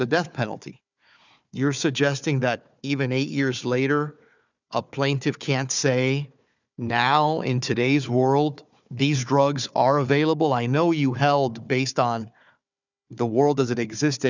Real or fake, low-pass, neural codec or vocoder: fake; 7.2 kHz; codec, 16 kHz, 4 kbps, FunCodec, trained on Chinese and English, 50 frames a second